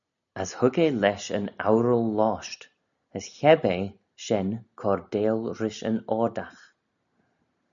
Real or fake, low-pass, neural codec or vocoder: real; 7.2 kHz; none